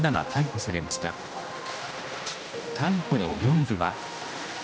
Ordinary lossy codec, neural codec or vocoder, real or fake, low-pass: none; codec, 16 kHz, 1 kbps, X-Codec, HuBERT features, trained on general audio; fake; none